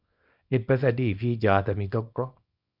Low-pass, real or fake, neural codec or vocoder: 5.4 kHz; fake; codec, 24 kHz, 0.9 kbps, WavTokenizer, small release